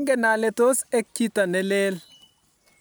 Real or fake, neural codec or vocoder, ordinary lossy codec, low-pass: real; none; none; none